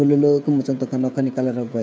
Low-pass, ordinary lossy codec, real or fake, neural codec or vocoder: none; none; fake; codec, 16 kHz, 16 kbps, FreqCodec, smaller model